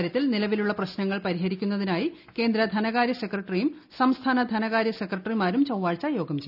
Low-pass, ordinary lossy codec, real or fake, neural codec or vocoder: 5.4 kHz; none; real; none